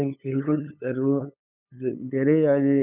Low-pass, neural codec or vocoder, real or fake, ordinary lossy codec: 3.6 kHz; codec, 16 kHz, 8 kbps, FunCodec, trained on LibriTTS, 25 frames a second; fake; none